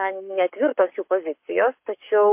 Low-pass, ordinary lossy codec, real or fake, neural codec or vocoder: 3.6 kHz; MP3, 32 kbps; fake; codec, 44.1 kHz, 7.8 kbps, Pupu-Codec